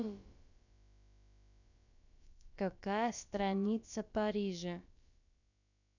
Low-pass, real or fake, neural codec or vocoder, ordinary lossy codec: 7.2 kHz; fake; codec, 16 kHz, about 1 kbps, DyCAST, with the encoder's durations; none